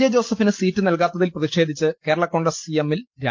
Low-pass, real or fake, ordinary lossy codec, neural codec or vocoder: 7.2 kHz; real; Opus, 32 kbps; none